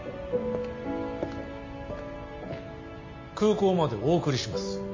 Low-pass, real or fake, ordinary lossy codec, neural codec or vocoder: 7.2 kHz; real; MP3, 32 kbps; none